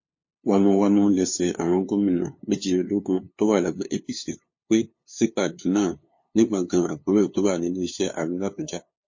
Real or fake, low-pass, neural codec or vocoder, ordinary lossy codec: fake; 7.2 kHz; codec, 16 kHz, 2 kbps, FunCodec, trained on LibriTTS, 25 frames a second; MP3, 32 kbps